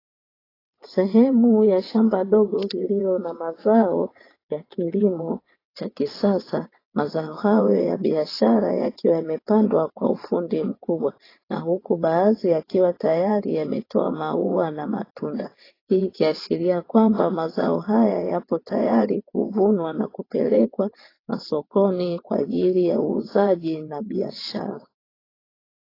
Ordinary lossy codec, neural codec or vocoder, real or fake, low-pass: AAC, 24 kbps; vocoder, 22.05 kHz, 80 mel bands, WaveNeXt; fake; 5.4 kHz